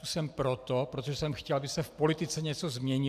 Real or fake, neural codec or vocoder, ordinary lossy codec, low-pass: real; none; AAC, 96 kbps; 14.4 kHz